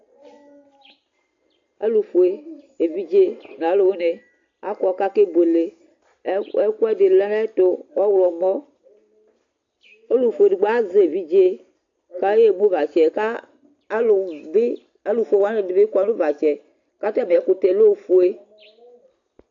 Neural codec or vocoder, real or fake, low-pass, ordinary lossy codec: none; real; 7.2 kHz; AAC, 48 kbps